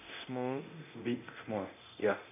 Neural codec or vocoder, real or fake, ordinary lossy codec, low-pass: codec, 24 kHz, 0.9 kbps, DualCodec; fake; none; 3.6 kHz